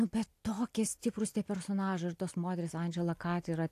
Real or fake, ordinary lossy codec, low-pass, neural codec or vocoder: real; AAC, 64 kbps; 14.4 kHz; none